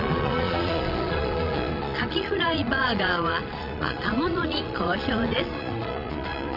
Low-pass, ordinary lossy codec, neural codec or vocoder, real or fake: 5.4 kHz; none; vocoder, 22.05 kHz, 80 mel bands, Vocos; fake